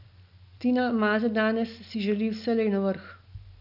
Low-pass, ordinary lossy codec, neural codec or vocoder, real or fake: 5.4 kHz; none; none; real